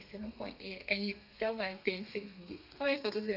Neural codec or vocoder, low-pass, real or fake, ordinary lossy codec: codec, 32 kHz, 1.9 kbps, SNAC; 5.4 kHz; fake; none